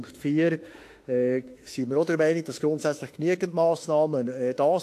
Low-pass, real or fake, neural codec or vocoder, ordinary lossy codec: 14.4 kHz; fake; autoencoder, 48 kHz, 32 numbers a frame, DAC-VAE, trained on Japanese speech; AAC, 64 kbps